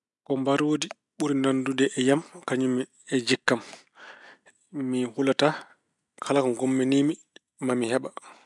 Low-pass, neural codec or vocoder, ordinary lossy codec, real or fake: 10.8 kHz; none; none; real